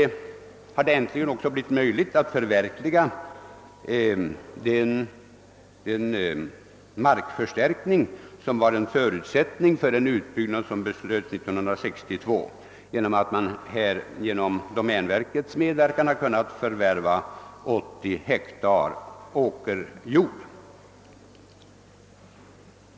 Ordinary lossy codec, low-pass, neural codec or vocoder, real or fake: none; none; none; real